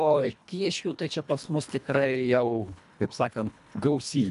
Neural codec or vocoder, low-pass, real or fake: codec, 24 kHz, 1.5 kbps, HILCodec; 10.8 kHz; fake